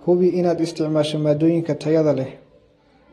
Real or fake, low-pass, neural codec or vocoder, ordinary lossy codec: real; 19.8 kHz; none; AAC, 32 kbps